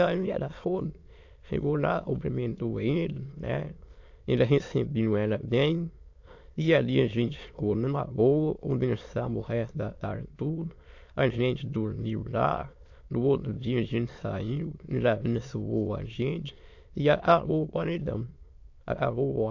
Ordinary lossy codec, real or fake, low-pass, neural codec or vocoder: AAC, 48 kbps; fake; 7.2 kHz; autoencoder, 22.05 kHz, a latent of 192 numbers a frame, VITS, trained on many speakers